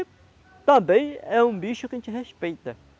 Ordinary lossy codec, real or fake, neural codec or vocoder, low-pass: none; real; none; none